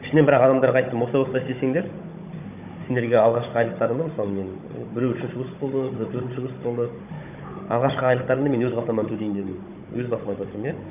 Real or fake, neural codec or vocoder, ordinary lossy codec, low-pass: fake; codec, 16 kHz, 16 kbps, FunCodec, trained on Chinese and English, 50 frames a second; none; 3.6 kHz